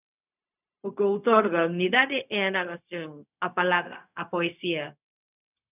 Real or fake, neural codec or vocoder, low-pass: fake; codec, 16 kHz, 0.4 kbps, LongCat-Audio-Codec; 3.6 kHz